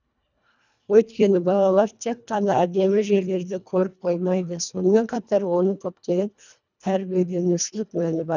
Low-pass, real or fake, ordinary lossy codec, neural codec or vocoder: 7.2 kHz; fake; none; codec, 24 kHz, 1.5 kbps, HILCodec